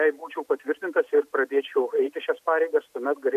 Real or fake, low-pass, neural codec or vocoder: real; 14.4 kHz; none